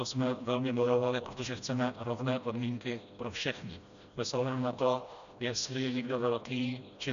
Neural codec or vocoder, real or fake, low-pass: codec, 16 kHz, 1 kbps, FreqCodec, smaller model; fake; 7.2 kHz